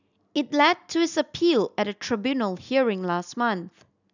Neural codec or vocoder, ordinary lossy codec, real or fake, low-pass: none; none; real; 7.2 kHz